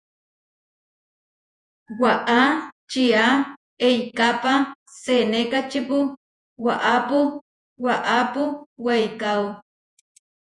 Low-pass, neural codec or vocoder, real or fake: 10.8 kHz; vocoder, 48 kHz, 128 mel bands, Vocos; fake